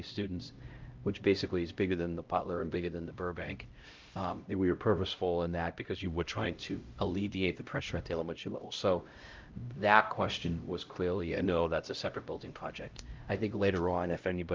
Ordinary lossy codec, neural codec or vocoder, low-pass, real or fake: Opus, 24 kbps; codec, 16 kHz, 0.5 kbps, X-Codec, HuBERT features, trained on LibriSpeech; 7.2 kHz; fake